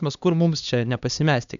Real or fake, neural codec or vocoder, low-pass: fake; codec, 16 kHz, 2 kbps, X-Codec, HuBERT features, trained on LibriSpeech; 7.2 kHz